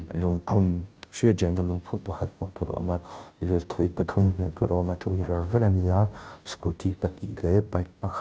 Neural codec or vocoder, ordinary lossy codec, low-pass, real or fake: codec, 16 kHz, 0.5 kbps, FunCodec, trained on Chinese and English, 25 frames a second; none; none; fake